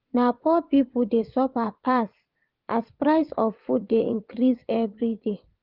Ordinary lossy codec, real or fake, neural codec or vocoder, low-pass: Opus, 32 kbps; fake; vocoder, 22.05 kHz, 80 mel bands, WaveNeXt; 5.4 kHz